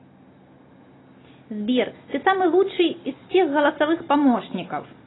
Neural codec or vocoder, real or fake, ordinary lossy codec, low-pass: none; real; AAC, 16 kbps; 7.2 kHz